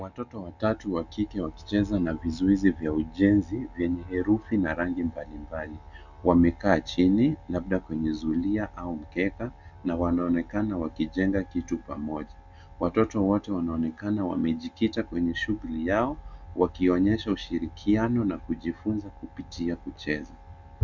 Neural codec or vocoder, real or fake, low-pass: none; real; 7.2 kHz